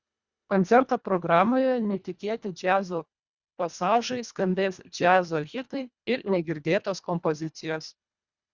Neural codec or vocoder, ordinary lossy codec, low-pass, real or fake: codec, 24 kHz, 1.5 kbps, HILCodec; Opus, 64 kbps; 7.2 kHz; fake